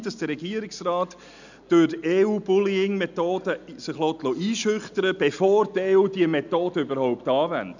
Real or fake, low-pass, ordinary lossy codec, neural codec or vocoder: real; 7.2 kHz; none; none